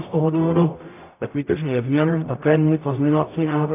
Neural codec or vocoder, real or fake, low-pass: codec, 44.1 kHz, 0.9 kbps, DAC; fake; 3.6 kHz